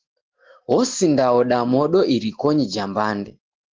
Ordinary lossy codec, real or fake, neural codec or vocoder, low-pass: Opus, 16 kbps; real; none; 7.2 kHz